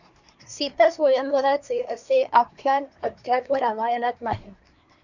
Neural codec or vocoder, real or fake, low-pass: codec, 24 kHz, 1 kbps, SNAC; fake; 7.2 kHz